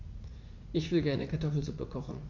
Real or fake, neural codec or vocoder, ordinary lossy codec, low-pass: fake; vocoder, 44.1 kHz, 80 mel bands, Vocos; AAC, 48 kbps; 7.2 kHz